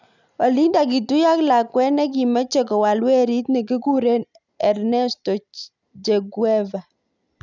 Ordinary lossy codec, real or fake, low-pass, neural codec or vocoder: none; real; 7.2 kHz; none